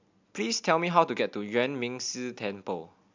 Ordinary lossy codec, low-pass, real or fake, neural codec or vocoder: MP3, 64 kbps; 7.2 kHz; real; none